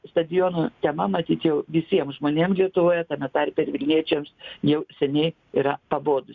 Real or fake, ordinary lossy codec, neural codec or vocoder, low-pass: real; Opus, 64 kbps; none; 7.2 kHz